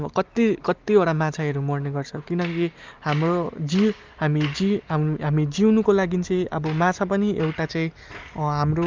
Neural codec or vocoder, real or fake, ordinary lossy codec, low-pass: none; real; Opus, 24 kbps; 7.2 kHz